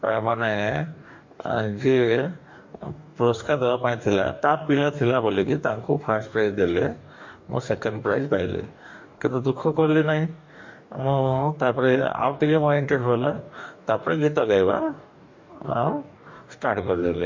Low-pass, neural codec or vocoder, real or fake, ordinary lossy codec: 7.2 kHz; codec, 44.1 kHz, 2.6 kbps, DAC; fake; MP3, 48 kbps